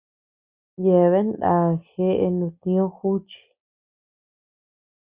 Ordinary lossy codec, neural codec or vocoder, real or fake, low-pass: Opus, 64 kbps; none; real; 3.6 kHz